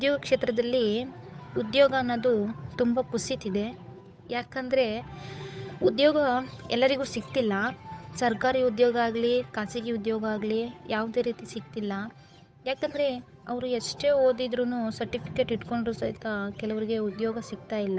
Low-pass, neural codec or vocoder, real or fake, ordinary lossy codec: none; codec, 16 kHz, 8 kbps, FunCodec, trained on Chinese and English, 25 frames a second; fake; none